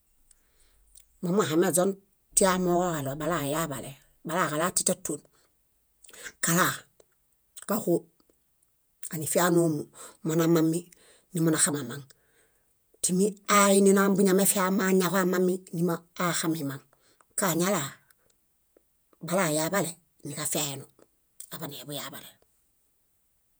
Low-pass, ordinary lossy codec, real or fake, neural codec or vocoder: none; none; fake; vocoder, 48 kHz, 128 mel bands, Vocos